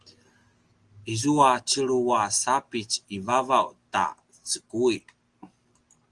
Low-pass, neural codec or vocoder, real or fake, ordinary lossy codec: 10.8 kHz; none; real; Opus, 24 kbps